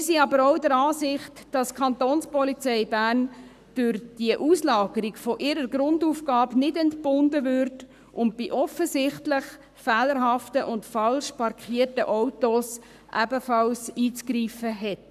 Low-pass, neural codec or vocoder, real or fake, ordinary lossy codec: 14.4 kHz; codec, 44.1 kHz, 7.8 kbps, Pupu-Codec; fake; none